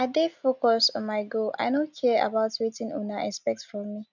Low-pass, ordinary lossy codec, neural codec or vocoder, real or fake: 7.2 kHz; none; none; real